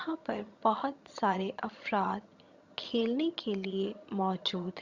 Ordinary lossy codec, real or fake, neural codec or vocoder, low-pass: Opus, 64 kbps; fake; vocoder, 22.05 kHz, 80 mel bands, HiFi-GAN; 7.2 kHz